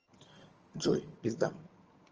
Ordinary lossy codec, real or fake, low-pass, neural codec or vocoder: Opus, 24 kbps; fake; 7.2 kHz; vocoder, 22.05 kHz, 80 mel bands, HiFi-GAN